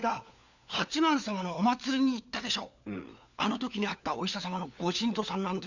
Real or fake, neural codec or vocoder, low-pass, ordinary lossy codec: fake; codec, 16 kHz, 4 kbps, FunCodec, trained on LibriTTS, 50 frames a second; 7.2 kHz; none